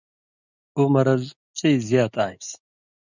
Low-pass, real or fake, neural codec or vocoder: 7.2 kHz; real; none